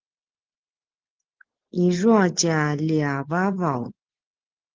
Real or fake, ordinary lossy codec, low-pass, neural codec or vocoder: real; Opus, 16 kbps; 7.2 kHz; none